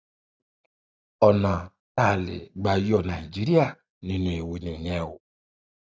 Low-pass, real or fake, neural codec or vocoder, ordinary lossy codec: none; real; none; none